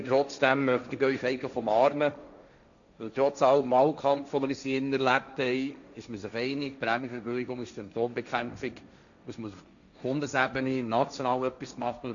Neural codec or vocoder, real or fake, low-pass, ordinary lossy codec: codec, 16 kHz, 1.1 kbps, Voila-Tokenizer; fake; 7.2 kHz; none